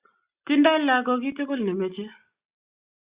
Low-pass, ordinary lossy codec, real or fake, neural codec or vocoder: 3.6 kHz; Opus, 64 kbps; real; none